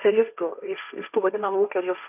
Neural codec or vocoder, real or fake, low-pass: codec, 16 kHz, 1.1 kbps, Voila-Tokenizer; fake; 3.6 kHz